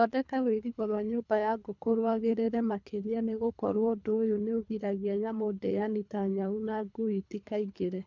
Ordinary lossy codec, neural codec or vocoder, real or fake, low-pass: none; codec, 24 kHz, 3 kbps, HILCodec; fake; 7.2 kHz